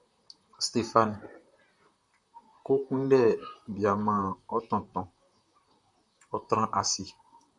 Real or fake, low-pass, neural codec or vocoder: fake; 10.8 kHz; vocoder, 44.1 kHz, 128 mel bands, Pupu-Vocoder